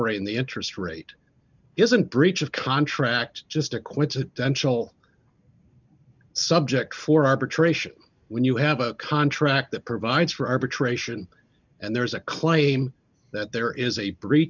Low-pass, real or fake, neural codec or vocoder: 7.2 kHz; real; none